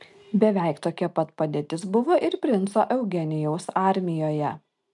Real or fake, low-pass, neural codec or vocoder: real; 10.8 kHz; none